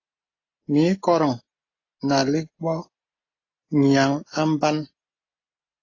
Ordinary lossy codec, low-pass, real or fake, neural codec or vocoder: AAC, 32 kbps; 7.2 kHz; real; none